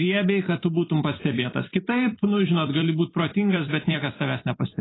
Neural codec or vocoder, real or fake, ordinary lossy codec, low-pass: vocoder, 44.1 kHz, 128 mel bands every 256 samples, BigVGAN v2; fake; AAC, 16 kbps; 7.2 kHz